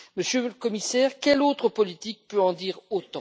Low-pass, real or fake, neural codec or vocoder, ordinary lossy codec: none; real; none; none